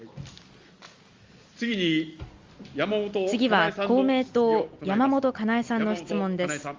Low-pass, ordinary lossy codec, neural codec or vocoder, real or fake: 7.2 kHz; Opus, 32 kbps; none; real